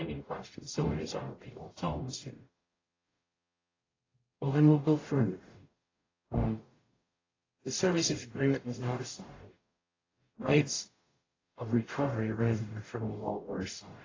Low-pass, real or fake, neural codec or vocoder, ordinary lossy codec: 7.2 kHz; fake; codec, 44.1 kHz, 0.9 kbps, DAC; AAC, 32 kbps